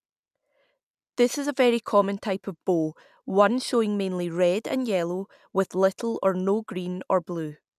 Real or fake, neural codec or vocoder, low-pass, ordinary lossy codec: real; none; 14.4 kHz; none